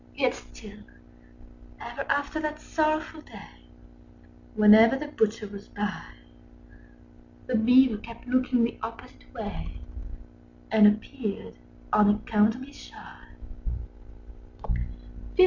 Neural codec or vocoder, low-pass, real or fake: none; 7.2 kHz; real